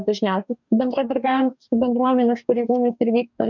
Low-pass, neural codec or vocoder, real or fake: 7.2 kHz; codec, 44.1 kHz, 2.6 kbps, DAC; fake